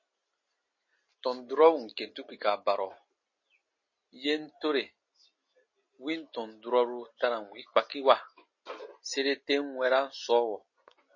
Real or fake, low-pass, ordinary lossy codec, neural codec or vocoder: real; 7.2 kHz; MP3, 32 kbps; none